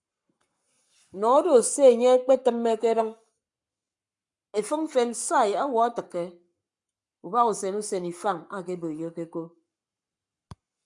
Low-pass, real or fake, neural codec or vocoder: 10.8 kHz; fake; codec, 44.1 kHz, 7.8 kbps, Pupu-Codec